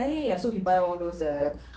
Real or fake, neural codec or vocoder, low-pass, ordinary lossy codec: fake; codec, 16 kHz, 2 kbps, X-Codec, HuBERT features, trained on general audio; none; none